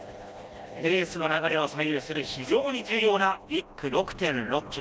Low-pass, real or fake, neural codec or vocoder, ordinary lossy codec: none; fake; codec, 16 kHz, 1 kbps, FreqCodec, smaller model; none